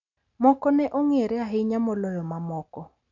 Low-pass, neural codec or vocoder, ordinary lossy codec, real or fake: 7.2 kHz; none; none; real